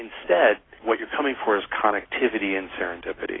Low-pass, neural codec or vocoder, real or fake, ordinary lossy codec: 7.2 kHz; none; real; AAC, 16 kbps